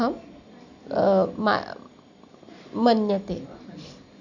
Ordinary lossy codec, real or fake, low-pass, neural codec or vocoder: none; real; 7.2 kHz; none